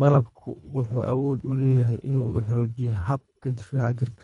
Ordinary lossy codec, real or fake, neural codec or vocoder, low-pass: none; fake; codec, 24 kHz, 1.5 kbps, HILCodec; 10.8 kHz